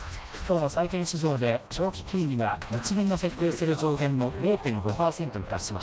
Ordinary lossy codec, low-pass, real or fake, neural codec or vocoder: none; none; fake; codec, 16 kHz, 1 kbps, FreqCodec, smaller model